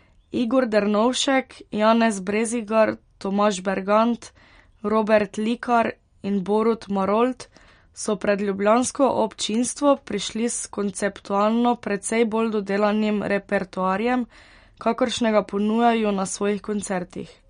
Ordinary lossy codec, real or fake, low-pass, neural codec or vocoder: MP3, 48 kbps; real; 9.9 kHz; none